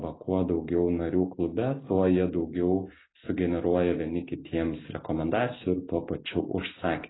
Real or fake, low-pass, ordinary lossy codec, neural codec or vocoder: real; 7.2 kHz; AAC, 16 kbps; none